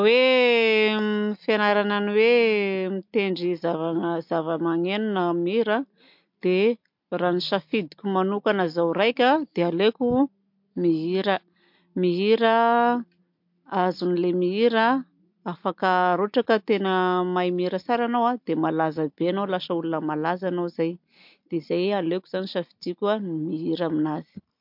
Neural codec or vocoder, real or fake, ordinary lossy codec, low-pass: none; real; MP3, 48 kbps; 5.4 kHz